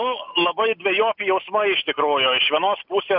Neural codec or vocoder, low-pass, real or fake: none; 5.4 kHz; real